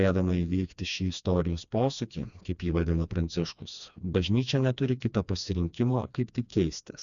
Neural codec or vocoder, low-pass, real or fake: codec, 16 kHz, 2 kbps, FreqCodec, smaller model; 7.2 kHz; fake